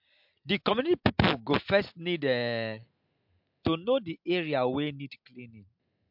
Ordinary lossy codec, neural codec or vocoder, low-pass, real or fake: MP3, 48 kbps; none; 5.4 kHz; real